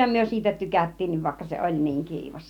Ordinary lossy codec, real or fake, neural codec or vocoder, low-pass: none; real; none; 19.8 kHz